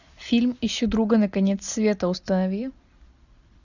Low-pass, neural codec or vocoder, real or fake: 7.2 kHz; none; real